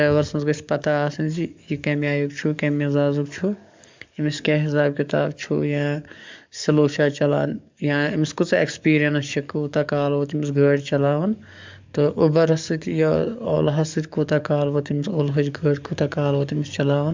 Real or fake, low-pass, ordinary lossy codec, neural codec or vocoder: fake; 7.2 kHz; MP3, 64 kbps; codec, 44.1 kHz, 7.8 kbps, DAC